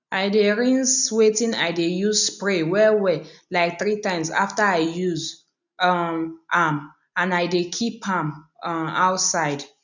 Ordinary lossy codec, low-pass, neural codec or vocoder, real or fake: none; 7.2 kHz; none; real